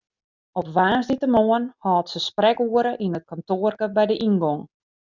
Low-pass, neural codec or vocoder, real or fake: 7.2 kHz; none; real